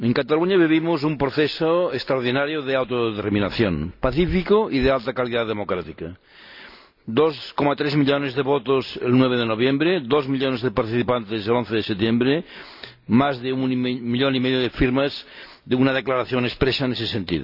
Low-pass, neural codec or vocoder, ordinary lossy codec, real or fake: 5.4 kHz; none; none; real